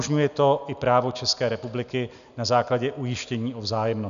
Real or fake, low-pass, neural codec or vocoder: real; 7.2 kHz; none